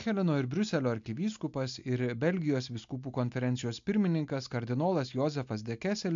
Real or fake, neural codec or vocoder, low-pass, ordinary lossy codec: real; none; 7.2 kHz; MP3, 64 kbps